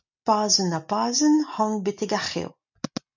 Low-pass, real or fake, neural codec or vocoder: 7.2 kHz; real; none